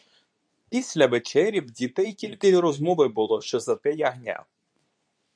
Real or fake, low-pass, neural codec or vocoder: fake; 9.9 kHz; codec, 24 kHz, 0.9 kbps, WavTokenizer, medium speech release version 2